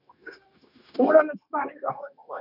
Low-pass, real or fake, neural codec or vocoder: 5.4 kHz; fake; codec, 16 kHz, 0.9 kbps, LongCat-Audio-Codec